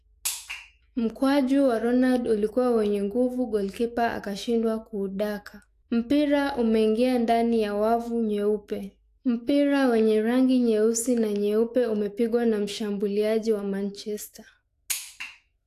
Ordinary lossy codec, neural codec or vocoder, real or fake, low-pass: AAC, 64 kbps; autoencoder, 48 kHz, 128 numbers a frame, DAC-VAE, trained on Japanese speech; fake; 14.4 kHz